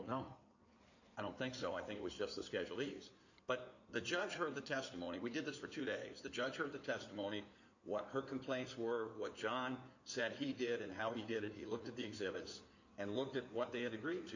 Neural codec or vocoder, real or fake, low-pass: codec, 16 kHz in and 24 kHz out, 2.2 kbps, FireRedTTS-2 codec; fake; 7.2 kHz